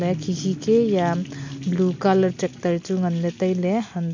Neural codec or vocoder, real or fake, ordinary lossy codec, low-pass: none; real; MP3, 48 kbps; 7.2 kHz